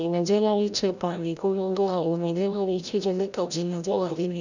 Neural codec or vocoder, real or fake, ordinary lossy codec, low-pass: codec, 16 kHz, 0.5 kbps, FreqCodec, larger model; fake; none; 7.2 kHz